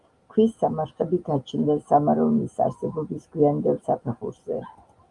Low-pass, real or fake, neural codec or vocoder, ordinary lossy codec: 10.8 kHz; real; none; Opus, 32 kbps